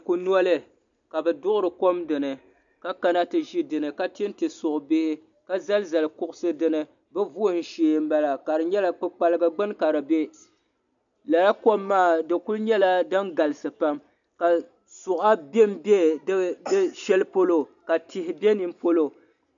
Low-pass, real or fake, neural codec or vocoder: 7.2 kHz; real; none